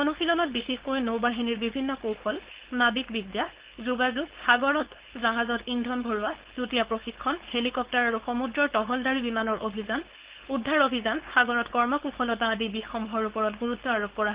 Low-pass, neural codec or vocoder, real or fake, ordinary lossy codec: 3.6 kHz; codec, 16 kHz, 4.8 kbps, FACodec; fake; Opus, 16 kbps